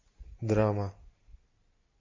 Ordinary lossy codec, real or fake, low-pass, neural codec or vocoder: MP3, 32 kbps; real; 7.2 kHz; none